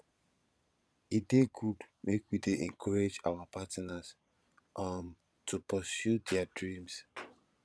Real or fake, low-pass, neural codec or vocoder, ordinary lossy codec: fake; none; vocoder, 22.05 kHz, 80 mel bands, Vocos; none